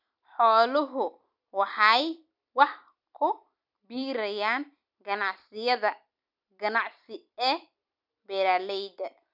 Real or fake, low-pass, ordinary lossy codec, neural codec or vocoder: fake; 5.4 kHz; none; vocoder, 44.1 kHz, 128 mel bands every 256 samples, BigVGAN v2